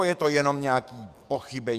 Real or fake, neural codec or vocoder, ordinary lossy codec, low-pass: fake; codec, 44.1 kHz, 7.8 kbps, DAC; Opus, 64 kbps; 14.4 kHz